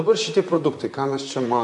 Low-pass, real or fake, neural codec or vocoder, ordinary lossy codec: 10.8 kHz; fake; vocoder, 44.1 kHz, 128 mel bands, Pupu-Vocoder; MP3, 64 kbps